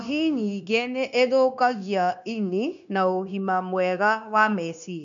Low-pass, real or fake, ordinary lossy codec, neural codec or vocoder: 7.2 kHz; fake; none; codec, 16 kHz, 0.9 kbps, LongCat-Audio-Codec